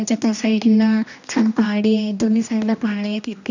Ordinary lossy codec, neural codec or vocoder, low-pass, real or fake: none; codec, 16 kHz, 1 kbps, X-Codec, HuBERT features, trained on general audio; 7.2 kHz; fake